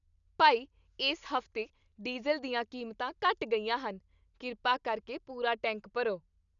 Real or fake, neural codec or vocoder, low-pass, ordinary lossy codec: real; none; 7.2 kHz; none